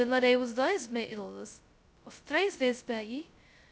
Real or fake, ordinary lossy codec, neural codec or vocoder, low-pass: fake; none; codec, 16 kHz, 0.2 kbps, FocalCodec; none